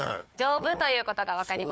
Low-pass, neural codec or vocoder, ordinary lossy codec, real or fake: none; codec, 16 kHz, 4 kbps, FunCodec, trained on Chinese and English, 50 frames a second; none; fake